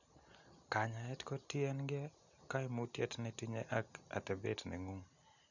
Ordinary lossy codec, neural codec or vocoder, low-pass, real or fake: none; none; 7.2 kHz; real